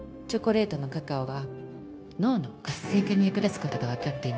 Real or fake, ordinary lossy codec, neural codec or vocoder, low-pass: fake; none; codec, 16 kHz, 0.9 kbps, LongCat-Audio-Codec; none